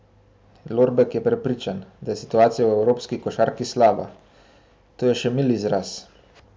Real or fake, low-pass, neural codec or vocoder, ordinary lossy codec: real; none; none; none